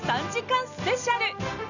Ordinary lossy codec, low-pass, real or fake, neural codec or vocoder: none; 7.2 kHz; real; none